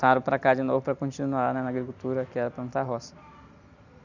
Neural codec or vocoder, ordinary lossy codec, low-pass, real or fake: none; none; 7.2 kHz; real